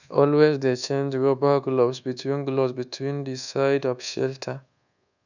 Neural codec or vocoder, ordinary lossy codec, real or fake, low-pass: codec, 24 kHz, 1.2 kbps, DualCodec; none; fake; 7.2 kHz